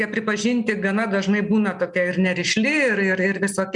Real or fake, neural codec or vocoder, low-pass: real; none; 10.8 kHz